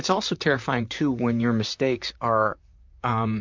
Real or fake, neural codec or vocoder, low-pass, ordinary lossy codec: fake; vocoder, 44.1 kHz, 128 mel bands, Pupu-Vocoder; 7.2 kHz; MP3, 64 kbps